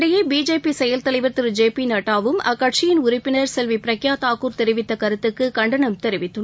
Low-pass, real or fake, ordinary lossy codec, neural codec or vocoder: none; real; none; none